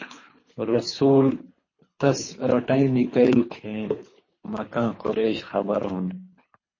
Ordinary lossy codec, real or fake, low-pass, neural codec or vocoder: MP3, 32 kbps; fake; 7.2 kHz; codec, 24 kHz, 3 kbps, HILCodec